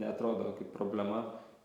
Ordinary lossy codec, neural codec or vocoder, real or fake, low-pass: Opus, 64 kbps; vocoder, 44.1 kHz, 128 mel bands every 512 samples, BigVGAN v2; fake; 19.8 kHz